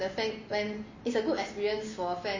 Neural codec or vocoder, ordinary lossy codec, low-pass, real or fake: none; MP3, 32 kbps; 7.2 kHz; real